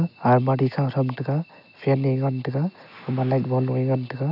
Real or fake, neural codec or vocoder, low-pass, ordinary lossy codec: real; none; 5.4 kHz; none